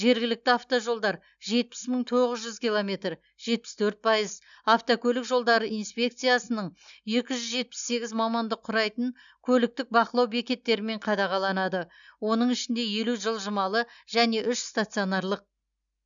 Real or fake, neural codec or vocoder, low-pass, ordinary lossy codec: real; none; 7.2 kHz; none